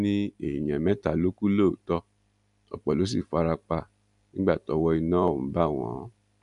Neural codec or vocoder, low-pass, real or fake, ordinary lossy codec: none; 10.8 kHz; real; none